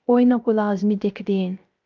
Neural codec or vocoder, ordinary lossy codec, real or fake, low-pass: codec, 16 kHz, 0.3 kbps, FocalCodec; Opus, 32 kbps; fake; 7.2 kHz